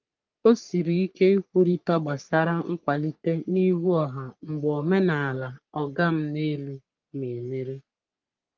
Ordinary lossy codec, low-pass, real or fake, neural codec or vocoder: Opus, 24 kbps; 7.2 kHz; fake; codec, 44.1 kHz, 3.4 kbps, Pupu-Codec